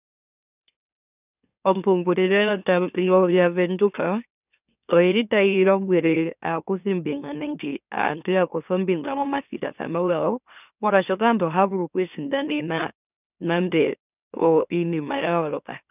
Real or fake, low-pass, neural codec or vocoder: fake; 3.6 kHz; autoencoder, 44.1 kHz, a latent of 192 numbers a frame, MeloTTS